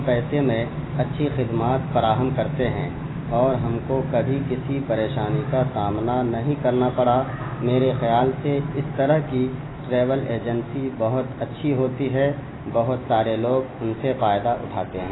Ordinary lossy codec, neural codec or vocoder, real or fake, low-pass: AAC, 16 kbps; none; real; 7.2 kHz